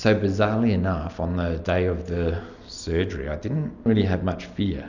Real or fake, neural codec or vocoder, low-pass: real; none; 7.2 kHz